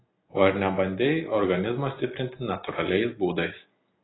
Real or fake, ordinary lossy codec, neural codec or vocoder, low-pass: real; AAC, 16 kbps; none; 7.2 kHz